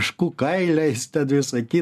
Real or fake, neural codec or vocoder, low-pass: real; none; 14.4 kHz